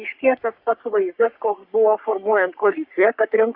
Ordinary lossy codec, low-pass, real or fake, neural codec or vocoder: Opus, 64 kbps; 5.4 kHz; fake; codec, 44.1 kHz, 3.4 kbps, Pupu-Codec